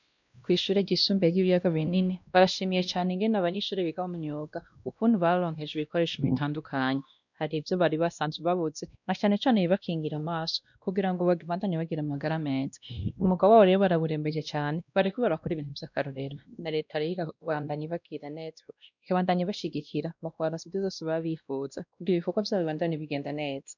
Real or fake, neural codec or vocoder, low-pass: fake; codec, 16 kHz, 1 kbps, X-Codec, WavLM features, trained on Multilingual LibriSpeech; 7.2 kHz